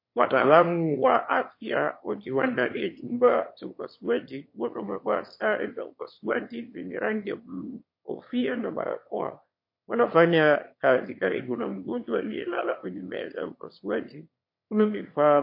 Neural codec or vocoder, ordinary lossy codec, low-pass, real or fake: autoencoder, 22.05 kHz, a latent of 192 numbers a frame, VITS, trained on one speaker; MP3, 32 kbps; 5.4 kHz; fake